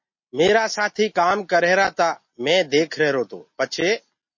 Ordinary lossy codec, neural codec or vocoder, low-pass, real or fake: MP3, 32 kbps; none; 7.2 kHz; real